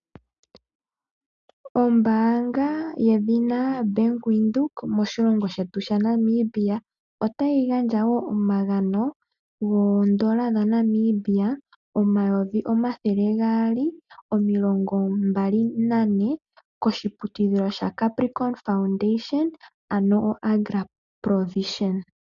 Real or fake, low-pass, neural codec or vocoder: real; 7.2 kHz; none